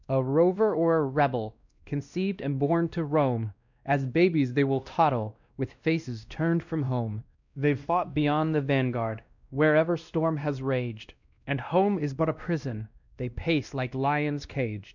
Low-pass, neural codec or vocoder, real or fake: 7.2 kHz; codec, 16 kHz, 1 kbps, X-Codec, WavLM features, trained on Multilingual LibriSpeech; fake